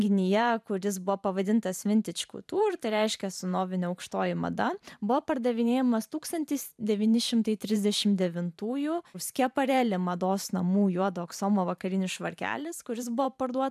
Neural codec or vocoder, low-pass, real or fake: vocoder, 44.1 kHz, 128 mel bands every 512 samples, BigVGAN v2; 14.4 kHz; fake